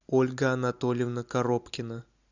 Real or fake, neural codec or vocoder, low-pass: real; none; 7.2 kHz